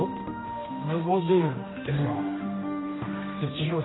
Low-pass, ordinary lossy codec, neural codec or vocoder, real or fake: 7.2 kHz; AAC, 16 kbps; codec, 16 kHz, 1 kbps, X-Codec, HuBERT features, trained on general audio; fake